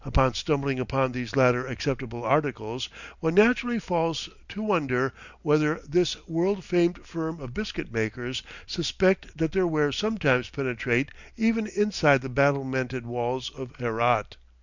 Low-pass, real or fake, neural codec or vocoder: 7.2 kHz; real; none